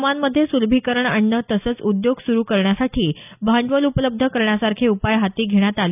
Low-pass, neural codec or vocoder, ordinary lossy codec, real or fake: 3.6 kHz; none; none; real